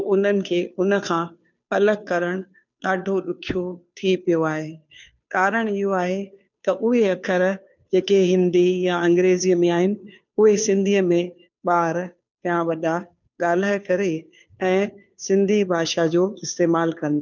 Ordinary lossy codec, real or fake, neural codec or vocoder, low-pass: none; fake; codec, 16 kHz, 2 kbps, FunCodec, trained on Chinese and English, 25 frames a second; 7.2 kHz